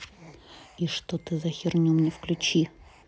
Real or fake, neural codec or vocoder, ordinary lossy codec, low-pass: real; none; none; none